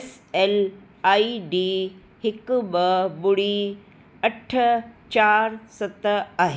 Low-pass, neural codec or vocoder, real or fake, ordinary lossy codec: none; none; real; none